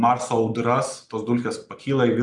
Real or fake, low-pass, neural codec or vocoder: real; 10.8 kHz; none